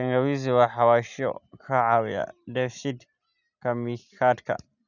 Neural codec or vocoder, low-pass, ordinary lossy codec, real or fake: none; 7.2 kHz; none; real